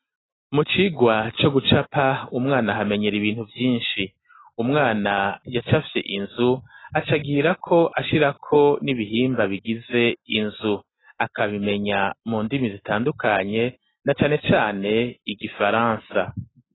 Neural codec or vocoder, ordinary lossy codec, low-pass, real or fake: none; AAC, 16 kbps; 7.2 kHz; real